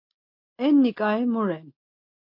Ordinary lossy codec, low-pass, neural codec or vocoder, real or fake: MP3, 32 kbps; 5.4 kHz; none; real